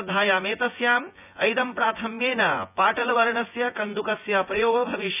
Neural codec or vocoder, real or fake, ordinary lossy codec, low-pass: vocoder, 24 kHz, 100 mel bands, Vocos; fake; none; 3.6 kHz